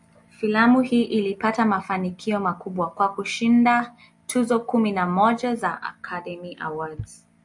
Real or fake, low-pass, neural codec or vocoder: real; 10.8 kHz; none